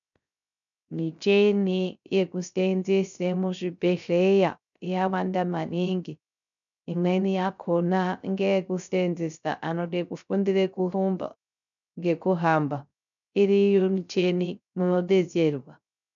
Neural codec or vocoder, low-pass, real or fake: codec, 16 kHz, 0.3 kbps, FocalCodec; 7.2 kHz; fake